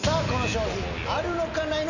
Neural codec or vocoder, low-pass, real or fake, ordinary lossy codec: none; 7.2 kHz; real; none